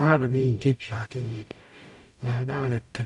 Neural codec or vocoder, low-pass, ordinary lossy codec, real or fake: codec, 44.1 kHz, 0.9 kbps, DAC; 10.8 kHz; none; fake